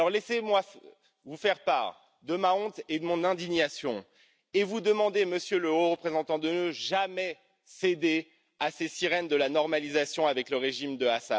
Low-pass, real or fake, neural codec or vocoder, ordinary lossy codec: none; real; none; none